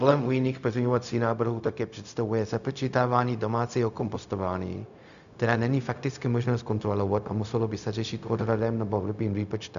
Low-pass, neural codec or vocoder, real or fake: 7.2 kHz; codec, 16 kHz, 0.4 kbps, LongCat-Audio-Codec; fake